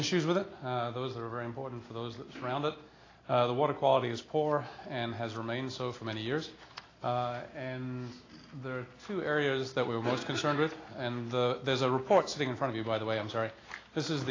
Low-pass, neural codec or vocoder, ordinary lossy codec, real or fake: 7.2 kHz; none; AAC, 32 kbps; real